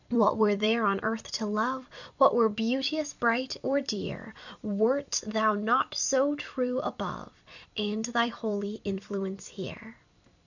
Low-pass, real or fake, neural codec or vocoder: 7.2 kHz; fake; vocoder, 44.1 kHz, 128 mel bands every 256 samples, BigVGAN v2